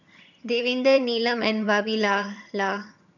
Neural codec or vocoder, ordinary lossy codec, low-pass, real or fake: vocoder, 22.05 kHz, 80 mel bands, HiFi-GAN; none; 7.2 kHz; fake